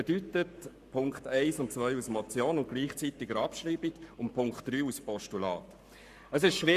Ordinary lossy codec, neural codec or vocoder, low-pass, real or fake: Opus, 64 kbps; codec, 44.1 kHz, 7.8 kbps, Pupu-Codec; 14.4 kHz; fake